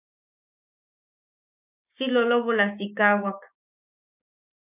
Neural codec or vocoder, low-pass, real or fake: codec, 16 kHz, 6 kbps, DAC; 3.6 kHz; fake